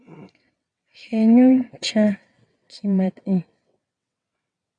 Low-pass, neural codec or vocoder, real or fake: 9.9 kHz; vocoder, 22.05 kHz, 80 mel bands, WaveNeXt; fake